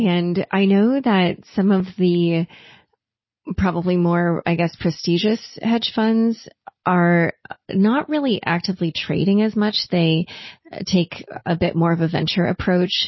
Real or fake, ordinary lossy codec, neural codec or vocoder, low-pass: real; MP3, 24 kbps; none; 7.2 kHz